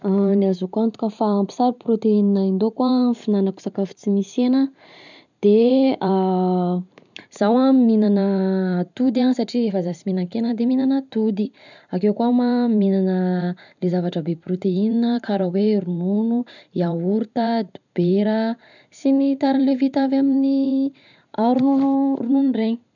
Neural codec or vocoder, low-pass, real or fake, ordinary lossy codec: vocoder, 44.1 kHz, 128 mel bands every 512 samples, BigVGAN v2; 7.2 kHz; fake; none